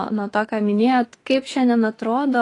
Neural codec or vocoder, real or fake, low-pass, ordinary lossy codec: autoencoder, 48 kHz, 32 numbers a frame, DAC-VAE, trained on Japanese speech; fake; 10.8 kHz; AAC, 32 kbps